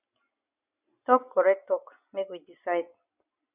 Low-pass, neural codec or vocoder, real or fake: 3.6 kHz; none; real